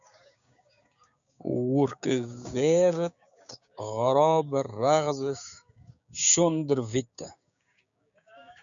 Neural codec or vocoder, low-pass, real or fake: codec, 16 kHz, 6 kbps, DAC; 7.2 kHz; fake